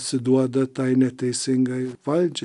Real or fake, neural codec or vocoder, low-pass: real; none; 10.8 kHz